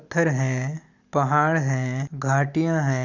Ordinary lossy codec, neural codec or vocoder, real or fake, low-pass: Opus, 64 kbps; none; real; 7.2 kHz